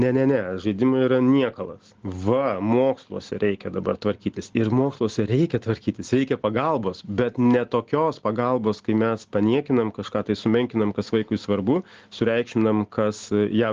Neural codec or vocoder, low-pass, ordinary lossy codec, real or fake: none; 7.2 kHz; Opus, 16 kbps; real